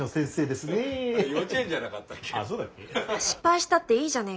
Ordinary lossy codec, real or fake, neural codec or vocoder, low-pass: none; real; none; none